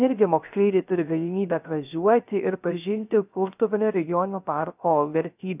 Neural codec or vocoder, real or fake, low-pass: codec, 16 kHz, 0.3 kbps, FocalCodec; fake; 3.6 kHz